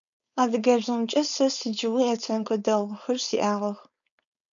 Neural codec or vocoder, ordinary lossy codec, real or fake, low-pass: codec, 16 kHz, 4.8 kbps, FACodec; MP3, 96 kbps; fake; 7.2 kHz